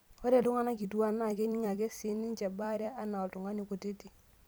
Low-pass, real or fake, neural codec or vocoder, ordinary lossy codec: none; fake; vocoder, 44.1 kHz, 128 mel bands every 256 samples, BigVGAN v2; none